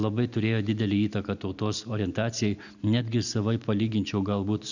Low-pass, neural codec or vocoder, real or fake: 7.2 kHz; none; real